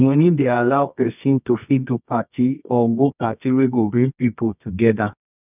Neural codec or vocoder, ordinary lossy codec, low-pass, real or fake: codec, 24 kHz, 0.9 kbps, WavTokenizer, medium music audio release; none; 3.6 kHz; fake